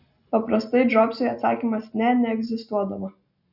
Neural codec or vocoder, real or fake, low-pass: none; real; 5.4 kHz